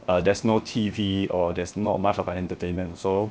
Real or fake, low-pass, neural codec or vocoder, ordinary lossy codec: fake; none; codec, 16 kHz, 0.7 kbps, FocalCodec; none